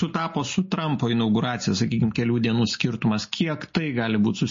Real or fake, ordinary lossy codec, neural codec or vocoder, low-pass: real; MP3, 32 kbps; none; 7.2 kHz